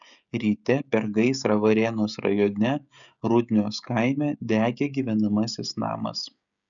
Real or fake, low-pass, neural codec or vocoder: fake; 7.2 kHz; codec, 16 kHz, 16 kbps, FreqCodec, smaller model